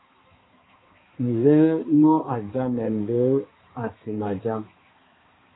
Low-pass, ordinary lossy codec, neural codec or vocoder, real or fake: 7.2 kHz; AAC, 16 kbps; codec, 16 kHz, 2 kbps, X-Codec, HuBERT features, trained on balanced general audio; fake